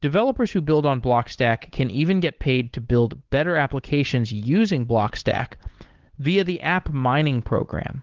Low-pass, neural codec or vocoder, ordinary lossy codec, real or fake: 7.2 kHz; codec, 16 kHz, 8 kbps, FunCodec, trained on Chinese and English, 25 frames a second; Opus, 16 kbps; fake